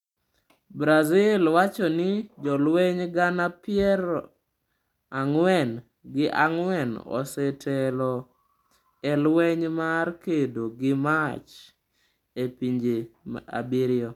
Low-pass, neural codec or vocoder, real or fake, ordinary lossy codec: 19.8 kHz; none; real; none